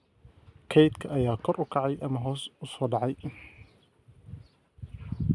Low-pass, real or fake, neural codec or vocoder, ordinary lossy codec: none; real; none; none